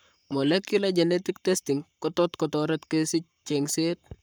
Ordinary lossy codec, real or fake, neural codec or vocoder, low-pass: none; fake; codec, 44.1 kHz, 7.8 kbps, Pupu-Codec; none